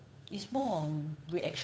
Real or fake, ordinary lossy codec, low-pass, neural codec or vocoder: fake; none; none; codec, 16 kHz, 8 kbps, FunCodec, trained on Chinese and English, 25 frames a second